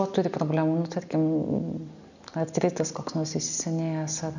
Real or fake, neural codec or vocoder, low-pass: real; none; 7.2 kHz